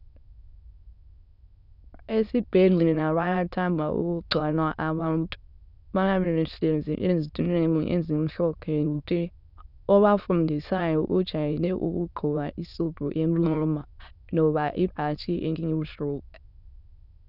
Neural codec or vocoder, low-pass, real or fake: autoencoder, 22.05 kHz, a latent of 192 numbers a frame, VITS, trained on many speakers; 5.4 kHz; fake